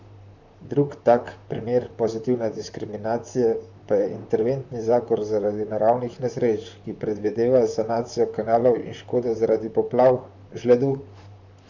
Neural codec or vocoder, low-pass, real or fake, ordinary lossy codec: vocoder, 44.1 kHz, 128 mel bands, Pupu-Vocoder; 7.2 kHz; fake; none